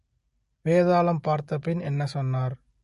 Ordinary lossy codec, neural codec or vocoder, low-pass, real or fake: MP3, 48 kbps; none; 14.4 kHz; real